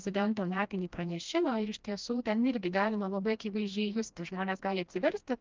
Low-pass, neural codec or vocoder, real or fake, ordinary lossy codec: 7.2 kHz; codec, 16 kHz, 1 kbps, FreqCodec, smaller model; fake; Opus, 32 kbps